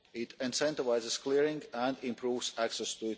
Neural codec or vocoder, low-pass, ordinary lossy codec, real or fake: none; none; none; real